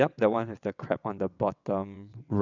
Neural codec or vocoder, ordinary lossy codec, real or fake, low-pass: vocoder, 22.05 kHz, 80 mel bands, WaveNeXt; none; fake; 7.2 kHz